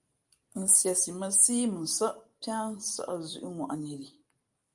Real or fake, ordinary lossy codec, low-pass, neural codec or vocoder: real; Opus, 32 kbps; 10.8 kHz; none